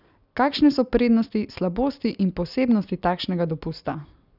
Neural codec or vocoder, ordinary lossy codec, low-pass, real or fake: none; none; 5.4 kHz; real